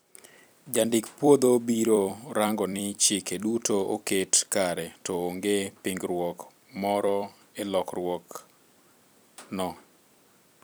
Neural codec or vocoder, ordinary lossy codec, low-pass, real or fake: vocoder, 44.1 kHz, 128 mel bands every 256 samples, BigVGAN v2; none; none; fake